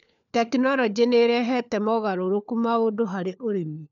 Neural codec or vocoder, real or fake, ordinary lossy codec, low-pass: codec, 16 kHz, 4 kbps, FunCodec, trained on LibriTTS, 50 frames a second; fake; none; 7.2 kHz